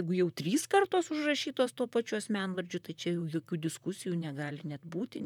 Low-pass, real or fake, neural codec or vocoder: 19.8 kHz; fake; vocoder, 44.1 kHz, 128 mel bands, Pupu-Vocoder